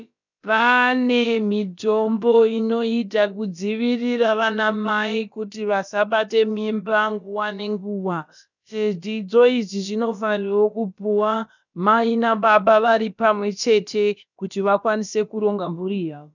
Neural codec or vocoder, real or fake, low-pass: codec, 16 kHz, about 1 kbps, DyCAST, with the encoder's durations; fake; 7.2 kHz